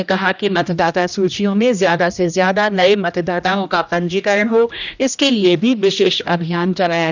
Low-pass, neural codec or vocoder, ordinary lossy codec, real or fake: 7.2 kHz; codec, 16 kHz, 1 kbps, X-Codec, HuBERT features, trained on general audio; none; fake